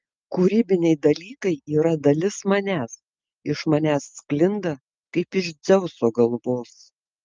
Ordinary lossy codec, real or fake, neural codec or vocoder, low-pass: Opus, 24 kbps; real; none; 7.2 kHz